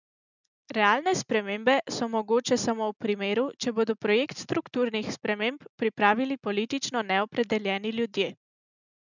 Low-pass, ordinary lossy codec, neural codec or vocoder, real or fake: 7.2 kHz; none; none; real